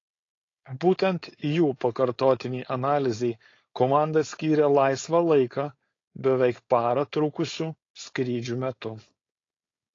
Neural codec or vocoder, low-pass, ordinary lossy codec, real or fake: codec, 16 kHz, 4.8 kbps, FACodec; 7.2 kHz; AAC, 32 kbps; fake